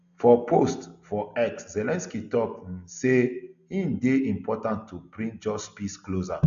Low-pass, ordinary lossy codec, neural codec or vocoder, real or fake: 7.2 kHz; none; none; real